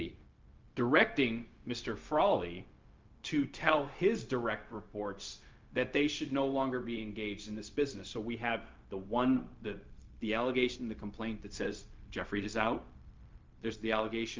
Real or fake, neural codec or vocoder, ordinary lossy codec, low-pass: fake; codec, 16 kHz, 0.4 kbps, LongCat-Audio-Codec; Opus, 24 kbps; 7.2 kHz